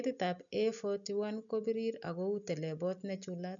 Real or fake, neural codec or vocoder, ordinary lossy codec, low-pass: real; none; none; 7.2 kHz